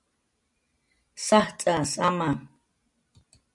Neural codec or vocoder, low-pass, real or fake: none; 10.8 kHz; real